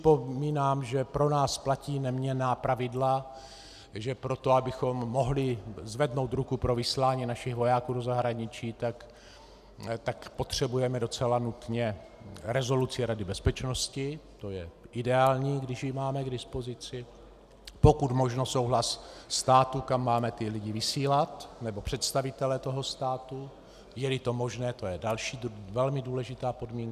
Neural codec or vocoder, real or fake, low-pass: none; real; 14.4 kHz